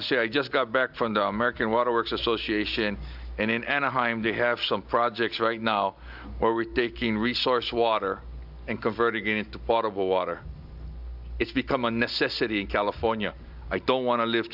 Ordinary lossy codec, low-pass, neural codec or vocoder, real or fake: AAC, 48 kbps; 5.4 kHz; none; real